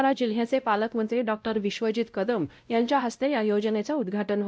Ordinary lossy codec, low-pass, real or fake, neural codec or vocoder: none; none; fake; codec, 16 kHz, 0.5 kbps, X-Codec, WavLM features, trained on Multilingual LibriSpeech